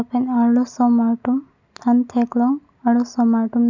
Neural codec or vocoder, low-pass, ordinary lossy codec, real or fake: none; 7.2 kHz; none; real